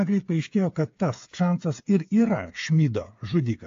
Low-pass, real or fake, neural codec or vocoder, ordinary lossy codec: 7.2 kHz; fake; codec, 16 kHz, 8 kbps, FreqCodec, smaller model; AAC, 48 kbps